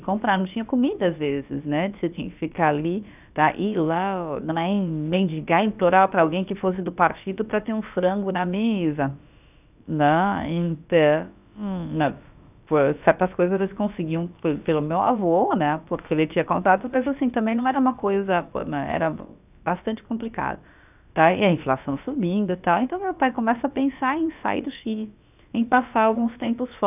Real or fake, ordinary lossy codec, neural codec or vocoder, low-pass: fake; none; codec, 16 kHz, about 1 kbps, DyCAST, with the encoder's durations; 3.6 kHz